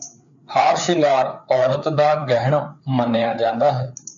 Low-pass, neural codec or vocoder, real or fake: 7.2 kHz; codec, 16 kHz, 4 kbps, FreqCodec, larger model; fake